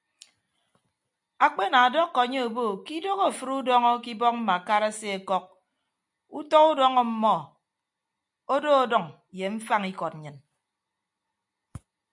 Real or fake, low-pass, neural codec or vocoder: real; 10.8 kHz; none